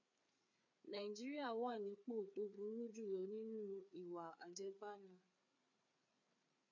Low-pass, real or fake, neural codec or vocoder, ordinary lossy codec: 7.2 kHz; fake; codec, 16 kHz, 4 kbps, FreqCodec, larger model; MP3, 48 kbps